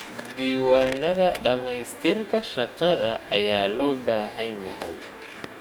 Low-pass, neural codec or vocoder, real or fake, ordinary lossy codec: 19.8 kHz; codec, 44.1 kHz, 2.6 kbps, DAC; fake; none